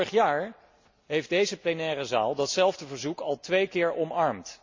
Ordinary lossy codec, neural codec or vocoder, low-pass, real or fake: MP3, 64 kbps; none; 7.2 kHz; real